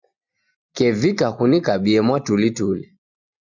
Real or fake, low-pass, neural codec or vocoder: real; 7.2 kHz; none